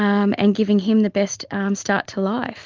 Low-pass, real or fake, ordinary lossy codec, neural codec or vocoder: 7.2 kHz; real; Opus, 32 kbps; none